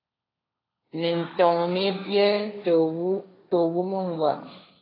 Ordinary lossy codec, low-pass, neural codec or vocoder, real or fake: AAC, 24 kbps; 5.4 kHz; codec, 16 kHz, 1.1 kbps, Voila-Tokenizer; fake